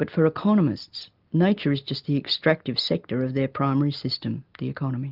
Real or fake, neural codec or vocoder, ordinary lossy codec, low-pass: real; none; Opus, 24 kbps; 5.4 kHz